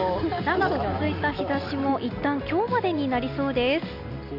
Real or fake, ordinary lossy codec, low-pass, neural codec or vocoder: real; none; 5.4 kHz; none